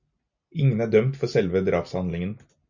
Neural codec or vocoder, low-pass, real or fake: none; 7.2 kHz; real